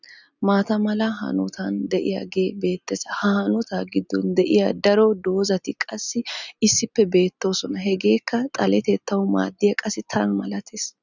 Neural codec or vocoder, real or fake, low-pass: none; real; 7.2 kHz